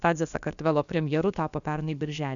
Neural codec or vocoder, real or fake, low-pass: codec, 16 kHz, about 1 kbps, DyCAST, with the encoder's durations; fake; 7.2 kHz